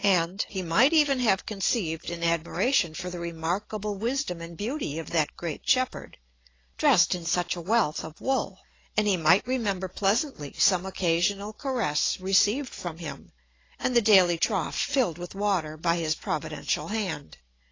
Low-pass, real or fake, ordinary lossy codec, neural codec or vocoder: 7.2 kHz; real; AAC, 32 kbps; none